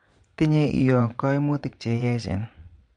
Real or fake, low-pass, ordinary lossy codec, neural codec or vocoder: fake; 9.9 kHz; MP3, 64 kbps; vocoder, 22.05 kHz, 80 mel bands, Vocos